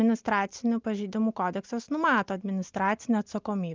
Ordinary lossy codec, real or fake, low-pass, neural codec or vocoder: Opus, 32 kbps; real; 7.2 kHz; none